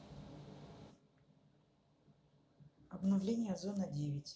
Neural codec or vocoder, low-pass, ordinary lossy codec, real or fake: none; none; none; real